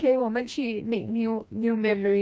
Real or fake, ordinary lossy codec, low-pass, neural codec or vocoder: fake; none; none; codec, 16 kHz, 1 kbps, FreqCodec, larger model